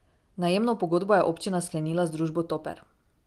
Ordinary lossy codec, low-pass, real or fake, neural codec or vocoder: Opus, 24 kbps; 14.4 kHz; real; none